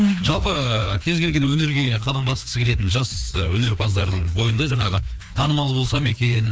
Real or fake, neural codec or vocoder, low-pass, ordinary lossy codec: fake; codec, 16 kHz, 2 kbps, FreqCodec, larger model; none; none